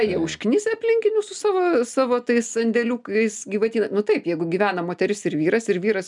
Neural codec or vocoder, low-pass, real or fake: none; 10.8 kHz; real